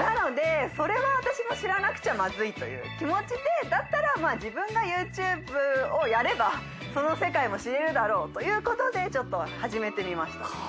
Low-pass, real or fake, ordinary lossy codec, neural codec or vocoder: none; real; none; none